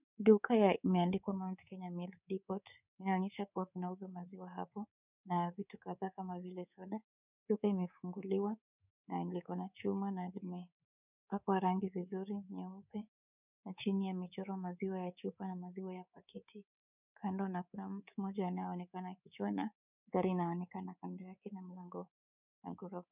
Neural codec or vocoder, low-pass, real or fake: codec, 24 kHz, 3.1 kbps, DualCodec; 3.6 kHz; fake